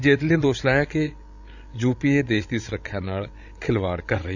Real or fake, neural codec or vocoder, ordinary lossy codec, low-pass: fake; codec, 16 kHz, 16 kbps, FreqCodec, larger model; none; 7.2 kHz